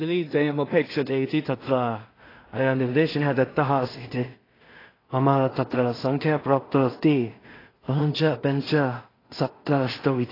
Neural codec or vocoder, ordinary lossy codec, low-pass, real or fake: codec, 16 kHz in and 24 kHz out, 0.4 kbps, LongCat-Audio-Codec, two codebook decoder; AAC, 24 kbps; 5.4 kHz; fake